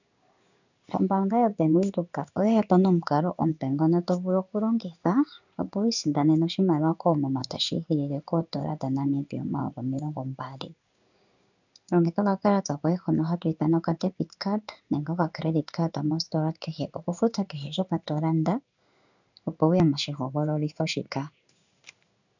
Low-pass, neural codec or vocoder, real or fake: 7.2 kHz; codec, 16 kHz in and 24 kHz out, 1 kbps, XY-Tokenizer; fake